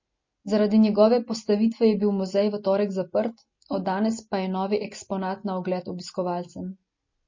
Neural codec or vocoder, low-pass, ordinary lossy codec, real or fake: none; 7.2 kHz; MP3, 32 kbps; real